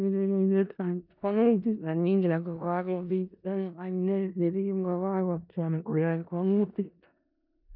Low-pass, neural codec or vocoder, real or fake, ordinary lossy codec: 5.4 kHz; codec, 16 kHz in and 24 kHz out, 0.4 kbps, LongCat-Audio-Codec, four codebook decoder; fake; MP3, 48 kbps